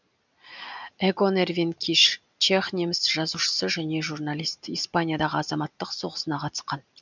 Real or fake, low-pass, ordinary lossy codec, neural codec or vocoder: real; 7.2 kHz; none; none